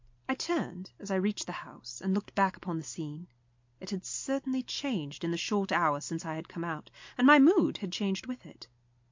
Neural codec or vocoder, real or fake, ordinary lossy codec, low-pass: none; real; MP3, 48 kbps; 7.2 kHz